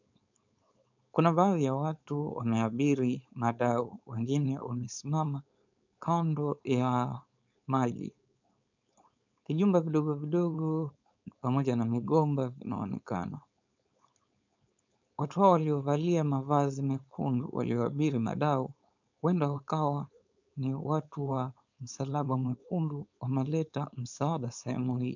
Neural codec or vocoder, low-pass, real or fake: codec, 16 kHz, 4.8 kbps, FACodec; 7.2 kHz; fake